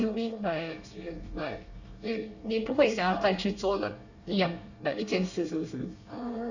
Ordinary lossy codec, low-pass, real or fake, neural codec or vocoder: none; 7.2 kHz; fake; codec, 24 kHz, 1 kbps, SNAC